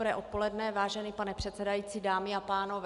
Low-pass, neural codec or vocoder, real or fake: 10.8 kHz; none; real